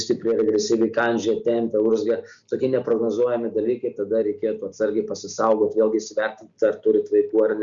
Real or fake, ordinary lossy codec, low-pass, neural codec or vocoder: real; Opus, 64 kbps; 7.2 kHz; none